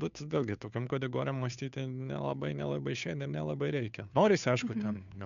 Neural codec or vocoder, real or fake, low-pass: codec, 16 kHz, 2 kbps, FunCodec, trained on Chinese and English, 25 frames a second; fake; 7.2 kHz